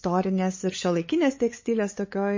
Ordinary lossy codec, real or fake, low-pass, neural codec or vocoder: MP3, 32 kbps; fake; 7.2 kHz; codec, 16 kHz, 8 kbps, FunCodec, trained on LibriTTS, 25 frames a second